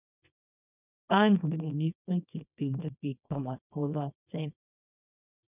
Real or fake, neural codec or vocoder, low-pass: fake; codec, 24 kHz, 0.9 kbps, WavTokenizer, small release; 3.6 kHz